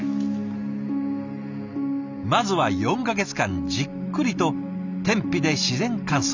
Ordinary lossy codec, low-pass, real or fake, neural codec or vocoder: none; 7.2 kHz; real; none